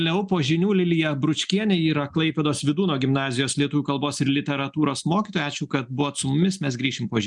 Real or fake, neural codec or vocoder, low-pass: real; none; 10.8 kHz